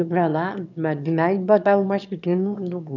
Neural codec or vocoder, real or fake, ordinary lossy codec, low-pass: autoencoder, 22.05 kHz, a latent of 192 numbers a frame, VITS, trained on one speaker; fake; none; 7.2 kHz